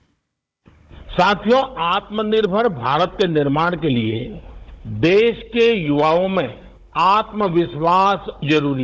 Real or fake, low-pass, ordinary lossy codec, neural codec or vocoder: fake; none; none; codec, 16 kHz, 16 kbps, FunCodec, trained on Chinese and English, 50 frames a second